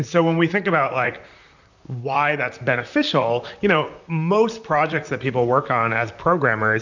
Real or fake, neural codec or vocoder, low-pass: fake; vocoder, 44.1 kHz, 128 mel bands, Pupu-Vocoder; 7.2 kHz